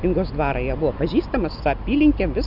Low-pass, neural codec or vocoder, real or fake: 5.4 kHz; none; real